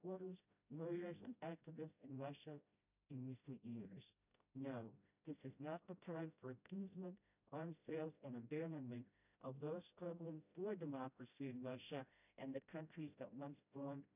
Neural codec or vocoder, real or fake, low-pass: codec, 16 kHz, 0.5 kbps, FreqCodec, smaller model; fake; 3.6 kHz